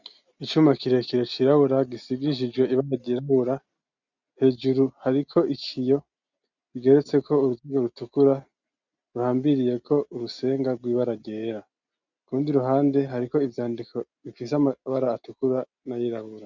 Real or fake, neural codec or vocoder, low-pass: real; none; 7.2 kHz